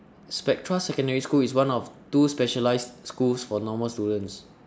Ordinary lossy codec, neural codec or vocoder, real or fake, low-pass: none; none; real; none